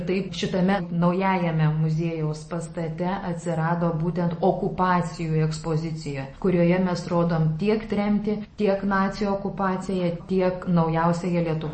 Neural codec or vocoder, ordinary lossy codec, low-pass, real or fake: none; MP3, 32 kbps; 10.8 kHz; real